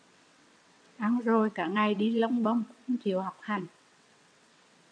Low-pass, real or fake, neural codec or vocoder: 9.9 kHz; fake; vocoder, 22.05 kHz, 80 mel bands, WaveNeXt